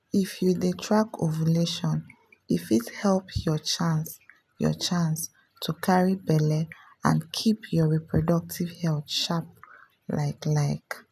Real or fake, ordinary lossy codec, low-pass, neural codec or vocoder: real; none; 14.4 kHz; none